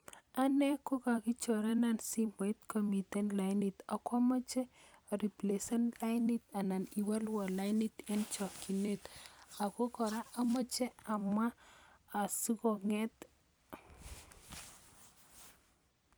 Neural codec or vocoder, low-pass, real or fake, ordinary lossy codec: vocoder, 44.1 kHz, 128 mel bands every 256 samples, BigVGAN v2; none; fake; none